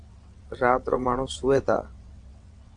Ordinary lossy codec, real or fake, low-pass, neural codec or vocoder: AAC, 64 kbps; fake; 9.9 kHz; vocoder, 22.05 kHz, 80 mel bands, WaveNeXt